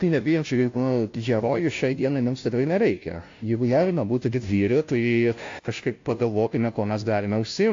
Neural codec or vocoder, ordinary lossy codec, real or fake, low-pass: codec, 16 kHz, 0.5 kbps, FunCodec, trained on Chinese and English, 25 frames a second; AAC, 48 kbps; fake; 7.2 kHz